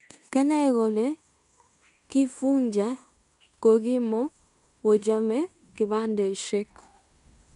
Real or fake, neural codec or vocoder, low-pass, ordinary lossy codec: fake; codec, 16 kHz in and 24 kHz out, 0.9 kbps, LongCat-Audio-Codec, fine tuned four codebook decoder; 10.8 kHz; none